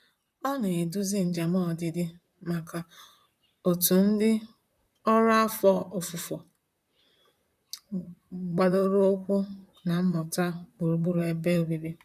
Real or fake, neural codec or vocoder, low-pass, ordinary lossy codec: fake; vocoder, 44.1 kHz, 128 mel bands, Pupu-Vocoder; 14.4 kHz; none